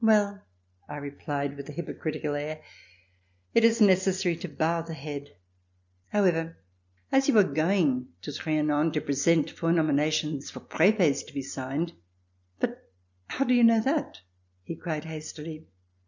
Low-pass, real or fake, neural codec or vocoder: 7.2 kHz; real; none